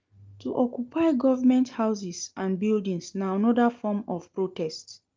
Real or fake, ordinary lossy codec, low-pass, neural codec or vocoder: real; Opus, 24 kbps; 7.2 kHz; none